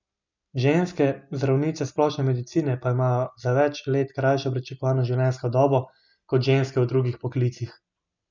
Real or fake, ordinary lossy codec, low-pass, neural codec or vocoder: real; none; 7.2 kHz; none